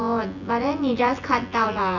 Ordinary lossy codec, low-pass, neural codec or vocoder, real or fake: none; 7.2 kHz; vocoder, 24 kHz, 100 mel bands, Vocos; fake